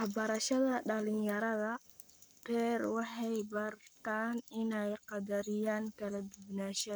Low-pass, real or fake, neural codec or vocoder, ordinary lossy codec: none; fake; codec, 44.1 kHz, 7.8 kbps, Pupu-Codec; none